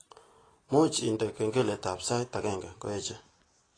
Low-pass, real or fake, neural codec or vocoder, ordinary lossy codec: 9.9 kHz; real; none; AAC, 32 kbps